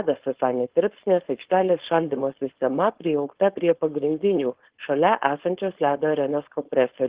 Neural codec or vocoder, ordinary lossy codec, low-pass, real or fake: codec, 16 kHz, 4.8 kbps, FACodec; Opus, 16 kbps; 3.6 kHz; fake